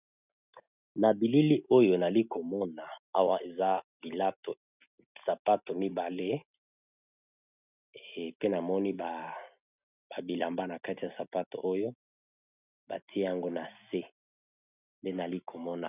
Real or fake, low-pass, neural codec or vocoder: real; 3.6 kHz; none